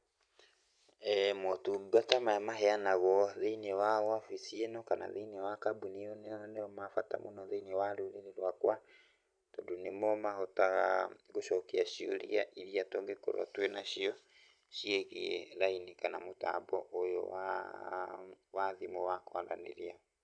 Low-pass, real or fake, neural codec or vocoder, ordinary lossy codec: 9.9 kHz; real; none; none